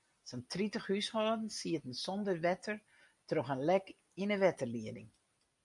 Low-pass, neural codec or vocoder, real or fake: 10.8 kHz; none; real